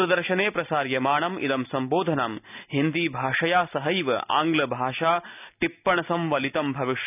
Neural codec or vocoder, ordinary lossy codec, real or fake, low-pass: none; none; real; 3.6 kHz